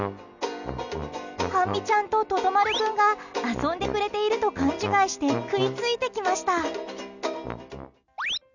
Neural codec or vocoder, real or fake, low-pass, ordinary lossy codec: none; real; 7.2 kHz; none